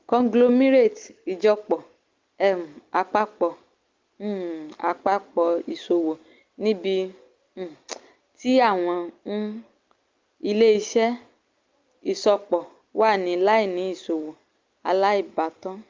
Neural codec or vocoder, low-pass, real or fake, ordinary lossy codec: none; 7.2 kHz; real; Opus, 32 kbps